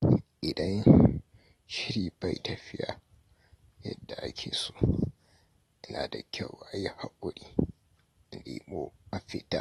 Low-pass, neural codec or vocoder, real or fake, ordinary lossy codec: 19.8 kHz; none; real; AAC, 32 kbps